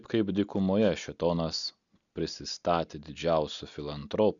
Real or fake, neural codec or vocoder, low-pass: real; none; 7.2 kHz